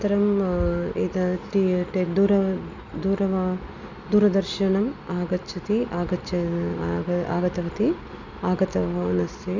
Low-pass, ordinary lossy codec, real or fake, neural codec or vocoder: 7.2 kHz; none; real; none